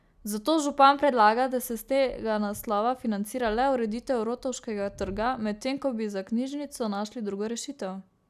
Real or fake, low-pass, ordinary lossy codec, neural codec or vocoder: real; 14.4 kHz; none; none